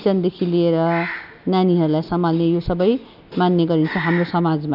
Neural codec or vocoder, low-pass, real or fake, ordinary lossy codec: none; 5.4 kHz; real; none